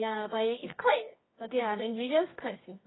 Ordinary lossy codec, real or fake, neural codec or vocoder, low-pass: AAC, 16 kbps; fake; codec, 24 kHz, 0.9 kbps, WavTokenizer, medium music audio release; 7.2 kHz